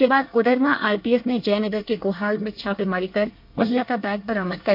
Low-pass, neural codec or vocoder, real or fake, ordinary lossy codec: 5.4 kHz; codec, 24 kHz, 1 kbps, SNAC; fake; MP3, 48 kbps